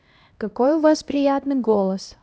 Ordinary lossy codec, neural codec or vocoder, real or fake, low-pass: none; codec, 16 kHz, 1 kbps, X-Codec, HuBERT features, trained on LibriSpeech; fake; none